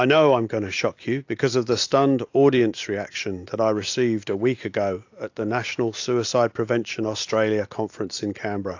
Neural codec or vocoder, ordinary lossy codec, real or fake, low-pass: none; AAC, 48 kbps; real; 7.2 kHz